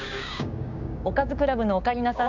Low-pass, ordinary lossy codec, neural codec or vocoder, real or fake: 7.2 kHz; none; codec, 16 kHz, 6 kbps, DAC; fake